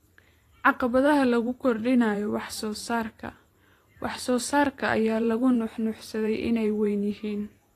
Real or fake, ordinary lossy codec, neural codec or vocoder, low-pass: fake; AAC, 48 kbps; vocoder, 44.1 kHz, 128 mel bands every 512 samples, BigVGAN v2; 14.4 kHz